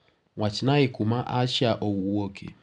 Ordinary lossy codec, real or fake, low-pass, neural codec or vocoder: none; real; 10.8 kHz; none